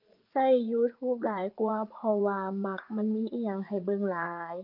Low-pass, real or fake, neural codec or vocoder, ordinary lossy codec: 5.4 kHz; fake; vocoder, 44.1 kHz, 80 mel bands, Vocos; Opus, 24 kbps